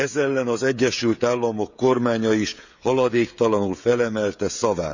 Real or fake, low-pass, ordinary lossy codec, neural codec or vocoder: fake; 7.2 kHz; none; codec, 16 kHz, 16 kbps, FreqCodec, smaller model